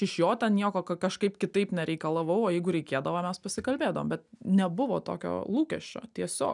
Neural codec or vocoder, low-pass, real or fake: none; 10.8 kHz; real